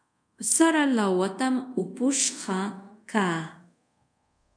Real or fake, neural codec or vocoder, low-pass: fake; codec, 24 kHz, 0.5 kbps, DualCodec; 9.9 kHz